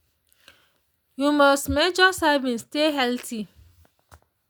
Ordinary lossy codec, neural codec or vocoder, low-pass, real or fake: none; none; none; real